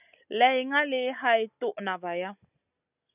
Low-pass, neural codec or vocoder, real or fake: 3.6 kHz; none; real